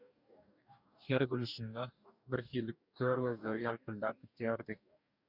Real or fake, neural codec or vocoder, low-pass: fake; codec, 44.1 kHz, 2.6 kbps, DAC; 5.4 kHz